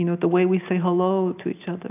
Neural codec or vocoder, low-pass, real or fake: none; 3.6 kHz; real